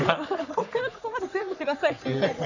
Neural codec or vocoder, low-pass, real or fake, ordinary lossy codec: codec, 44.1 kHz, 3.4 kbps, Pupu-Codec; 7.2 kHz; fake; none